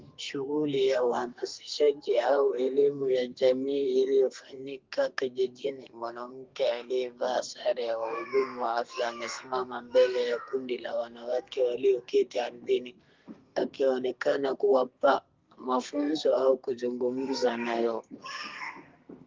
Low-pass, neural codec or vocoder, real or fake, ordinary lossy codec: 7.2 kHz; codec, 32 kHz, 1.9 kbps, SNAC; fake; Opus, 32 kbps